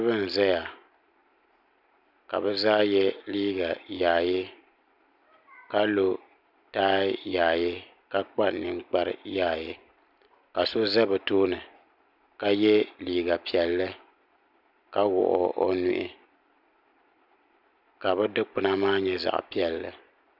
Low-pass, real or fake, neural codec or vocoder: 5.4 kHz; real; none